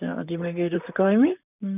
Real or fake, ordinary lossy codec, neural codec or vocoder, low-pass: fake; none; vocoder, 44.1 kHz, 128 mel bands, Pupu-Vocoder; 3.6 kHz